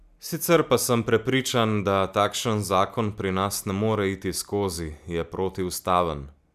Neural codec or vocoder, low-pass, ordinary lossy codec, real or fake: none; 14.4 kHz; none; real